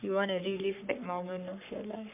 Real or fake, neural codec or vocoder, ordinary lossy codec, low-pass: fake; codec, 44.1 kHz, 3.4 kbps, Pupu-Codec; MP3, 32 kbps; 3.6 kHz